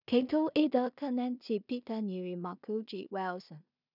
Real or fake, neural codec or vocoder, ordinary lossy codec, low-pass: fake; codec, 16 kHz in and 24 kHz out, 0.4 kbps, LongCat-Audio-Codec, two codebook decoder; none; 5.4 kHz